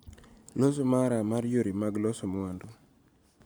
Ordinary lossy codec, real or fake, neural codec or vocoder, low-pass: none; real; none; none